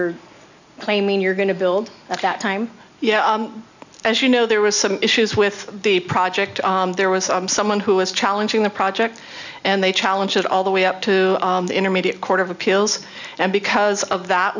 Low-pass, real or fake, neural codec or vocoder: 7.2 kHz; real; none